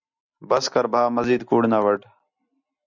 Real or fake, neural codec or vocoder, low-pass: real; none; 7.2 kHz